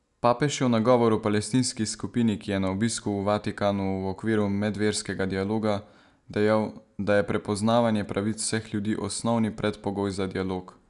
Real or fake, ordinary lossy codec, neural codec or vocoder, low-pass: real; none; none; 10.8 kHz